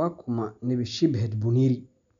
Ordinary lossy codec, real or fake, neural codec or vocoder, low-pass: MP3, 64 kbps; real; none; 7.2 kHz